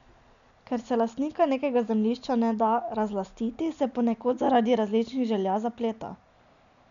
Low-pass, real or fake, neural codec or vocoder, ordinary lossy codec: 7.2 kHz; real; none; none